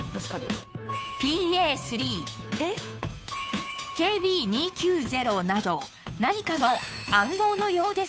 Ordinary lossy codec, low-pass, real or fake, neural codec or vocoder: none; none; fake; codec, 16 kHz, 2 kbps, FunCodec, trained on Chinese and English, 25 frames a second